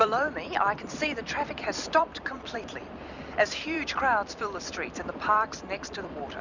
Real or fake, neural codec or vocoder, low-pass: fake; vocoder, 44.1 kHz, 128 mel bands every 256 samples, BigVGAN v2; 7.2 kHz